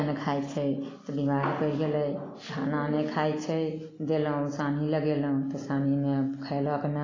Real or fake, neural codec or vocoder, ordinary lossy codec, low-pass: real; none; AAC, 32 kbps; 7.2 kHz